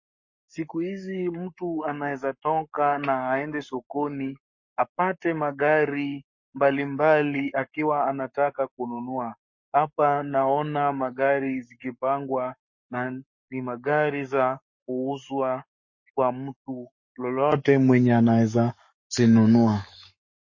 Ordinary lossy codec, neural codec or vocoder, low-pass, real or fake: MP3, 32 kbps; codec, 44.1 kHz, 7.8 kbps, Pupu-Codec; 7.2 kHz; fake